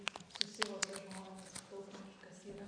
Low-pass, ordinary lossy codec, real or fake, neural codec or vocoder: 9.9 kHz; AAC, 48 kbps; real; none